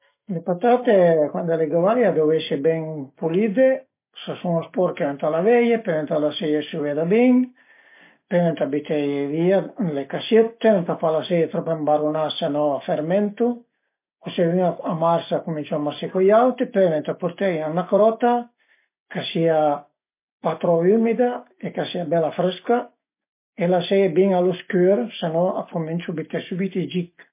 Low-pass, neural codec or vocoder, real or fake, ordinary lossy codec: 3.6 kHz; none; real; MP3, 24 kbps